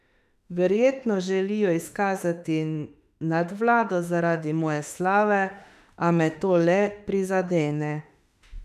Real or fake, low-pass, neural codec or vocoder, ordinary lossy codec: fake; 14.4 kHz; autoencoder, 48 kHz, 32 numbers a frame, DAC-VAE, trained on Japanese speech; none